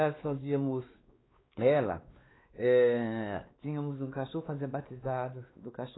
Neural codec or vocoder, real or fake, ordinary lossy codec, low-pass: codec, 16 kHz, 2 kbps, X-Codec, WavLM features, trained on Multilingual LibriSpeech; fake; AAC, 16 kbps; 7.2 kHz